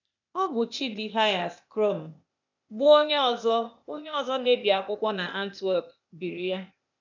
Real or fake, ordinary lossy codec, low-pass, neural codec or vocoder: fake; none; 7.2 kHz; codec, 16 kHz, 0.8 kbps, ZipCodec